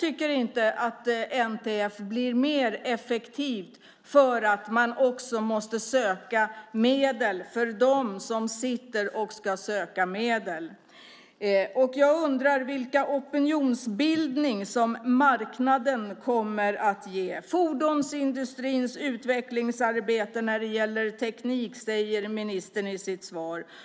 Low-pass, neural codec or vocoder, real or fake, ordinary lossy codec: none; none; real; none